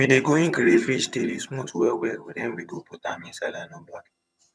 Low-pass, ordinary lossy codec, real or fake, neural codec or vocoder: none; none; fake; vocoder, 22.05 kHz, 80 mel bands, HiFi-GAN